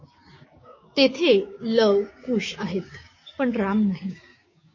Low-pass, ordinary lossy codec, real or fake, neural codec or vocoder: 7.2 kHz; MP3, 32 kbps; fake; codec, 16 kHz, 6 kbps, DAC